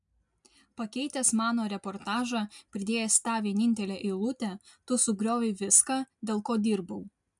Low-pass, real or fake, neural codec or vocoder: 10.8 kHz; real; none